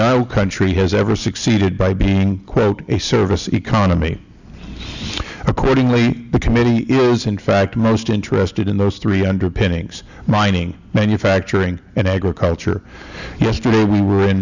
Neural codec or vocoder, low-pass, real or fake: none; 7.2 kHz; real